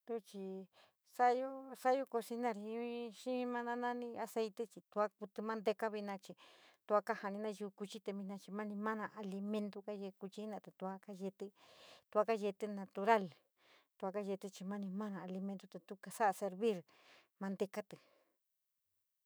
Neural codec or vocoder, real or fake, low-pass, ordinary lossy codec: autoencoder, 48 kHz, 128 numbers a frame, DAC-VAE, trained on Japanese speech; fake; none; none